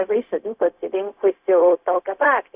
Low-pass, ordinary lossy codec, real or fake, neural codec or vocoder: 3.6 kHz; Opus, 64 kbps; fake; codec, 16 kHz, 0.4 kbps, LongCat-Audio-Codec